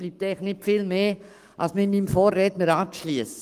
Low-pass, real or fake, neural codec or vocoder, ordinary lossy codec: 14.4 kHz; fake; codec, 44.1 kHz, 7.8 kbps, Pupu-Codec; Opus, 32 kbps